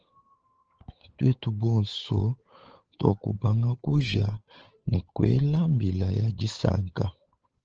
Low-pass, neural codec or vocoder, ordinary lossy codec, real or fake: 7.2 kHz; codec, 16 kHz, 8 kbps, FunCodec, trained on Chinese and English, 25 frames a second; Opus, 16 kbps; fake